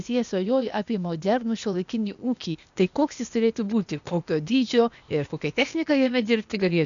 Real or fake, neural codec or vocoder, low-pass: fake; codec, 16 kHz, 0.8 kbps, ZipCodec; 7.2 kHz